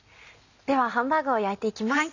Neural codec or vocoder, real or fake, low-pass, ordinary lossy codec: none; real; 7.2 kHz; none